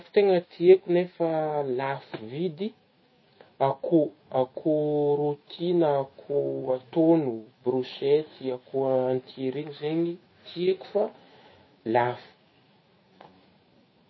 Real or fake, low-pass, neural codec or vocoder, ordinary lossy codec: fake; 7.2 kHz; autoencoder, 48 kHz, 128 numbers a frame, DAC-VAE, trained on Japanese speech; MP3, 24 kbps